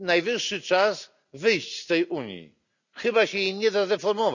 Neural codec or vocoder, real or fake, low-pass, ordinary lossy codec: none; real; 7.2 kHz; none